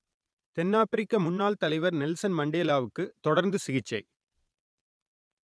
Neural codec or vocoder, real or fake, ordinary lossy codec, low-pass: vocoder, 22.05 kHz, 80 mel bands, Vocos; fake; none; none